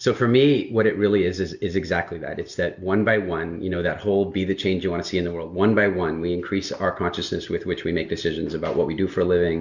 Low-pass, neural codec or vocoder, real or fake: 7.2 kHz; none; real